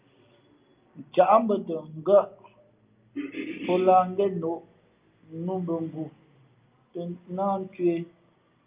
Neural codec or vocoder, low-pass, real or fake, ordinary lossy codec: none; 3.6 kHz; real; Opus, 24 kbps